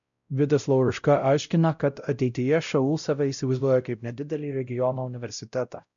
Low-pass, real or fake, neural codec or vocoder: 7.2 kHz; fake; codec, 16 kHz, 0.5 kbps, X-Codec, WavLM features, trained on Multilingual LibriSpeech